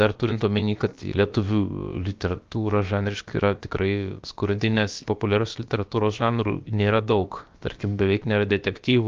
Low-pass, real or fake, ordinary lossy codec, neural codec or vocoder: 7.2 kHz; fake; Opus, 32 kbps; codec, 16 kHz, about 1 kbps, DyCAST, with the encoder's durations